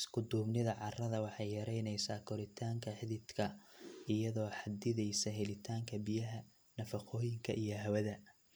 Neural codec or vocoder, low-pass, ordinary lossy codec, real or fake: none; none; none; real